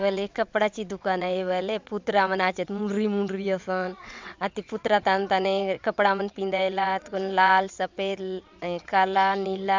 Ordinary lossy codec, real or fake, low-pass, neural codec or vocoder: MP3, 64 kbps; fake; 7.2 kHz; vocoder, 22.05 kHz, 80 mel bands, WaveNeXt